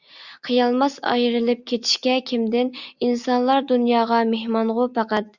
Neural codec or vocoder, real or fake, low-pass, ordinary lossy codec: none; real; 7.2 kHz; Opus, 64 kbps